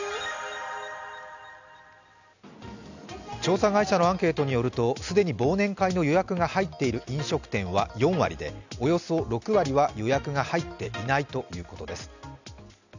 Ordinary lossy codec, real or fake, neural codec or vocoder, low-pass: none; real; none; 7.2 kHz